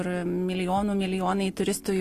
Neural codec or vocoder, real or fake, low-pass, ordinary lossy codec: none; real; 14.4 kHz; AAC, 48 kbps